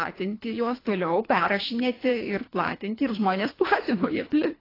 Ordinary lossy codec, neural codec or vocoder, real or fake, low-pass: AAC, 24 kbps; codec, 24 kHz, 3 kbps, HILCodec; fake; 5.4 kHz